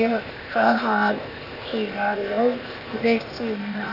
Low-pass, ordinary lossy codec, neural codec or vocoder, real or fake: 5.4 kHz; none; codec, 16 kHz, 0.8 kbps, ZipCodec; fake